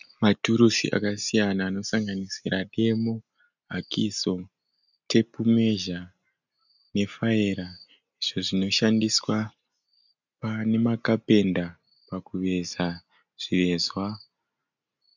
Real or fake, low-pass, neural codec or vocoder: real; 7.2 kHz; none